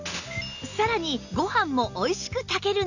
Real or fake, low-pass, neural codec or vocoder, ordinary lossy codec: real; 7.2 kHz; none; none